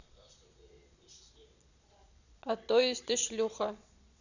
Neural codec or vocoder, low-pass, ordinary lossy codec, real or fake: vocoder, 22.05 kHz, 80 mel bands, Vocos; 7.2 kHz; none; fake